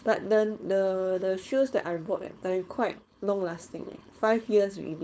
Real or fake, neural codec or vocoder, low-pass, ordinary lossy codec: fake; codec, 16 kHz, 4.8 kbps, FACodec; none; none